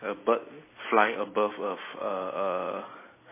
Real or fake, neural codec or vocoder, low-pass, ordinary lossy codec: real; none; 3.6 kHz; MP3, 16 kbps